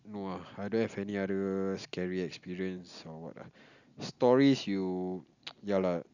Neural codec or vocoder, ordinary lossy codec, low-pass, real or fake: none; none; 7.2 kHz; real